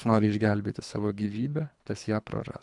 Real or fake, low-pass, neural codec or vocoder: fake; 10.8 kHz; codec, 24 kHz, 3 kbps, HILCodec